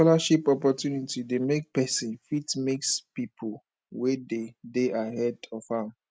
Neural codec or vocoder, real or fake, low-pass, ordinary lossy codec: none; real; none; none